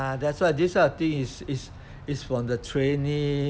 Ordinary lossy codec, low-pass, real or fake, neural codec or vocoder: none; none; real; none